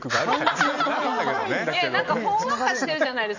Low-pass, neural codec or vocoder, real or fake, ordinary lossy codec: 7.2 kHz; none; real; none